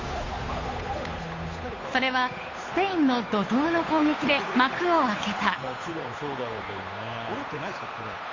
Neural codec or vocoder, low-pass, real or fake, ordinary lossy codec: codec, 16 kHz, 8 kbps, FunCodec, trained on Chinese and English, 25 frames a second; 7.2 kHz; fake; AAC, 32 kbps